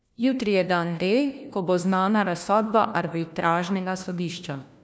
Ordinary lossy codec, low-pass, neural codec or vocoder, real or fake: none; none; codec, 16 kHz, 1 kbps, FunCodec, trained on LibriTTS, 50 frames a second; fake